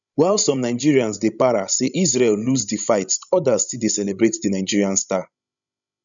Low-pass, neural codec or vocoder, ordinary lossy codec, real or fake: 7.2 kHz; codec, 16 kHz, 16 kbps, FreqCodec, larger model; none; fake